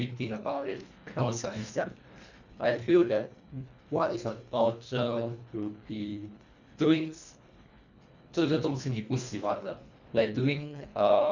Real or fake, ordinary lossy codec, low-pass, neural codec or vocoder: fake; none; 7.2 kHz; codec, 24 kHz, 1.5 kbps, HILCodec